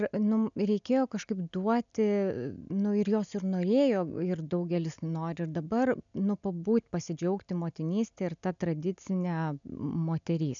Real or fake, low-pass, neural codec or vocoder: real; 7.2 kHz; none